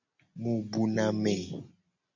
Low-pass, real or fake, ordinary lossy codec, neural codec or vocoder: 7.2 kHz; real; MP3, 48 kbps; none